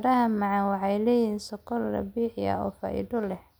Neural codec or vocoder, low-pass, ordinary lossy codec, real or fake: none; none; none; real